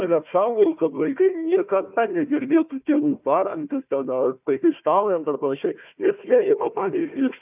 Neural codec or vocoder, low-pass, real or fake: codec, 16 kHz, 1 kbps, FunCodec, trained on Chinese and English, 50 frames a second; 3.6 kHz; fake